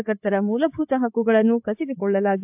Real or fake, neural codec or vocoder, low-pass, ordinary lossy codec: fake; codec, 16 kHz, 8 kbps, FreqCodec, larger model; 3.6 kHz; AAC, 32 kbps